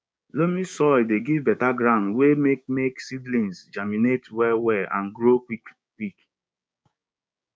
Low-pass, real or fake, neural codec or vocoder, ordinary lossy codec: none; fake; codec, 16 kHz, 6 kbps, DAC; none